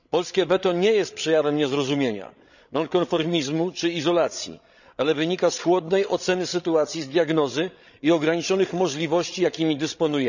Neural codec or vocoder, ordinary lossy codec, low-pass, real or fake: codec, 16 kHz, 16 kbps, FreqCodec, larger model; none; 7.2 kHz; fake